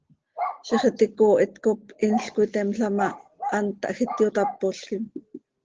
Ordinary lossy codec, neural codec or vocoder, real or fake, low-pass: Opus, 16 kbps; none; real; 7.2 kHz